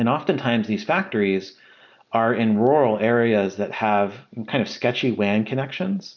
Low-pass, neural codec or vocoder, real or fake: 7.2 kHz; none; real